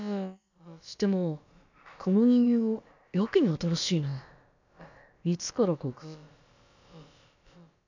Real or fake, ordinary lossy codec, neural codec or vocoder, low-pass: fake; none; codec, 16 kHz, about 1 kbps, DyCAST, with the encoder's durations; 7.2 kHz